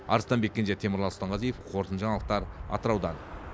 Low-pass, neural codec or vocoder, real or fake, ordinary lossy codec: none; none; real; none